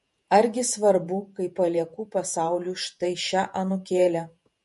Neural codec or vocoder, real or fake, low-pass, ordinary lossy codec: vocoder, 48 kHz, 128 mel bands, Vocos; fake; 14.4 kHz; MP3, 48 kbps